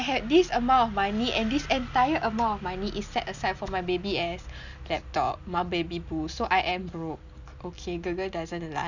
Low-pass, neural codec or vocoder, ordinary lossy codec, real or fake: 7.2 kHz; none; none; real